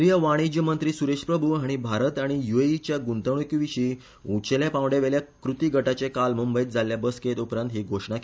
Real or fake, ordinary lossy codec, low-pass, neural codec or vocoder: real; none; none; none